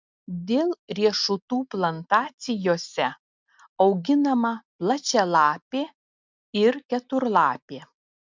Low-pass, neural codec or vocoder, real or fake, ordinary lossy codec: 7.2 kHz; none; real; MP3, 64 kbps